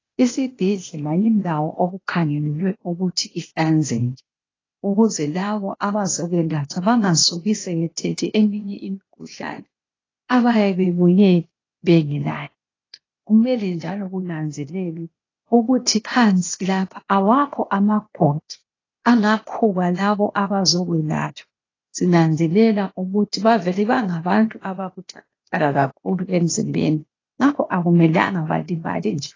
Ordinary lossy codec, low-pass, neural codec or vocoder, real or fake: AAC, 32 kbps; 7.2 kHz; codec, 16 kHz, 0.8 kbps, ZipCodec; fake